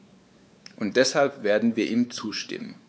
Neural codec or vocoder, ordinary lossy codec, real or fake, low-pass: codec, 16 kHz, 4 kbps, X-Codec, WavLM features, trained on Multilingual LibriSpeech; none; fake; none